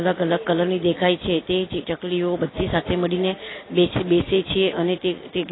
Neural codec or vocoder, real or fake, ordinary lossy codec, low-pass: none; real; AAC, 16 kbps; 7.2 kHz